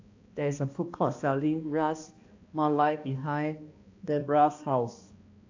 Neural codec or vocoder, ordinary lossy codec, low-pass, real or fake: codec, 16 kHz, 1 kbps, X-Codec, HuBERT features, trained on balanced general audio; AAC, 48 kbps; 7.2 kHz; fake